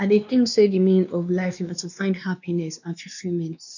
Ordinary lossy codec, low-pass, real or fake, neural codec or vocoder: none; 7.2 kHz; fake; codec, 16 kHz, 2 kbps, X-Codec, WavLM features, trained on Multilingual LibriSpeech